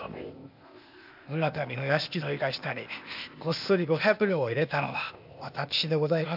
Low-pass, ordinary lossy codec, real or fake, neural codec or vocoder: 5.4 kHz; AAC, 48 kbps; fake; codec, 16 kHz, 0.8 kbps, ZipCodec